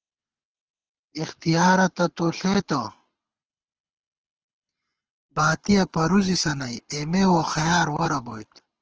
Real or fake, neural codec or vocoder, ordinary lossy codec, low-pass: fake; codec, 24 kHz, 6 kbps, HILCodec; Opus, 16 kbps; 7.2 kHz